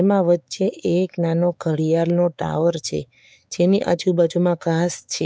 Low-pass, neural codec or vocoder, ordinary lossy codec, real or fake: none; codec, 16 kHz, 4 kbps, X-Codec, WavLM features, trained on Multilingual LibriSpeech; none; fake